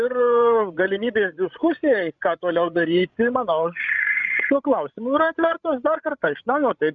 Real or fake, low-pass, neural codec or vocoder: fake; 7.2 kHz; codec, 16 kHz, 16 kbps, FreqCodec, larger model